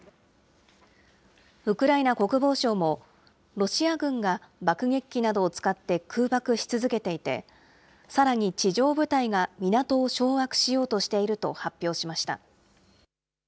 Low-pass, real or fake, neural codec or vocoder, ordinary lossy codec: none; real; none; none